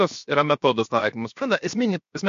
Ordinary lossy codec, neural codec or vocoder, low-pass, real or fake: MP3, 48 kbps; codec, 16 kHz, 0.7 kbps, FocalCodec; 7.2 kHz; fake